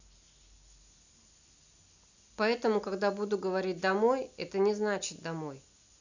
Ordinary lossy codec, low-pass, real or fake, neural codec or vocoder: none; 7.2 kHz; real; none